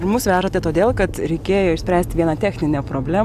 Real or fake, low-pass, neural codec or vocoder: real; 14.4 kHz; none